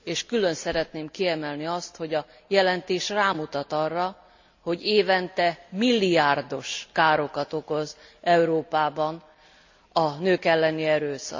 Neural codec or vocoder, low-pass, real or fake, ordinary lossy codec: none; 7.2 kHz; real; MP3, 64 kbps